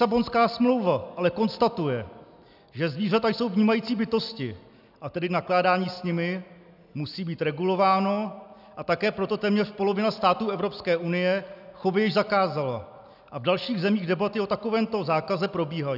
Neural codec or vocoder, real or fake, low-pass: none; real; 5.4 kHz